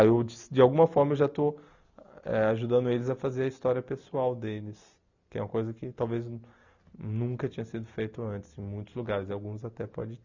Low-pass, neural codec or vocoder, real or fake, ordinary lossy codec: 7.2 kHz; none; real; none